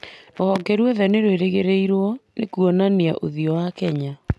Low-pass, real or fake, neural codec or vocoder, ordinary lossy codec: none; real; none; none